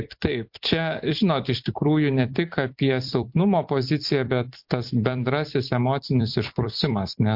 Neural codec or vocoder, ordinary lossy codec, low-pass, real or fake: vocoder, 44.1 kHz, 128 mel bands every 256 samples, BigVGAN v2; MP3, 48 kbps; 5.4 kHz; fake